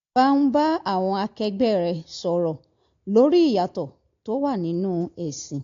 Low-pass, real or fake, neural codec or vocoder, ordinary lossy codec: 7.2 kHz; real; none; AAC, 48 kbps